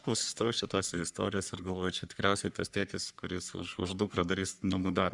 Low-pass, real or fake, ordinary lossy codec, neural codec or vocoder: 10.8 kHz; fake; Opus, 64 kbps; codec, 44.1 kHz, 3.4 kbps, Pupu-Codec